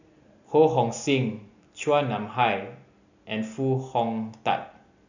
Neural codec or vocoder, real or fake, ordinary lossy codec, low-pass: none; real; none; 7.2 kHz